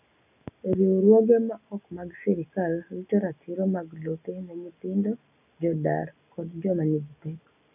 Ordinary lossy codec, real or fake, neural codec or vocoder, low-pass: none; real; none; 3.6 kHz